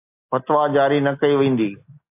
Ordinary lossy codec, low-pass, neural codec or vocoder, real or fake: AAC, 32 kbps; 3.6 kHz; none; real